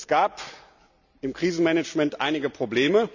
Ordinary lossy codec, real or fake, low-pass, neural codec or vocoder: none; real; 7.2 kHz; none